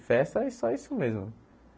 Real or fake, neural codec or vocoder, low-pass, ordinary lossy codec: real; none; none; none